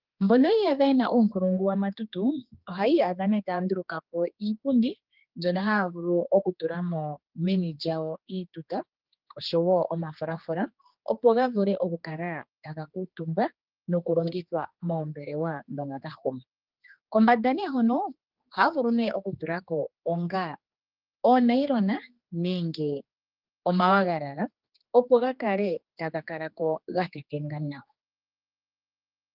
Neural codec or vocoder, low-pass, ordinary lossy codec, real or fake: codec, 16 kHz, 2 kbps, X-Codec, HuBERT features, trained on general audio; 5.4 kHz; Opus, 24 kbps; fake